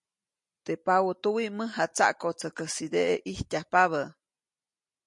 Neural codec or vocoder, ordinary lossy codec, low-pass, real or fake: none; MP3, 48 kbps; 10.8 kHz; real